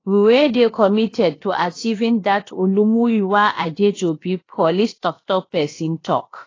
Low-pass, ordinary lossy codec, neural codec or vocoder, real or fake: 7.2 kHz; AAC, 32 kbps; codec, 16 kHz, about 1 kbps, DyCAST, with the encoder's durations; fake